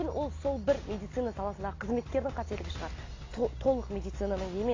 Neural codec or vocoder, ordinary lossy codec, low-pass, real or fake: none; AAC, 32 kbps; 7.2 kHz; real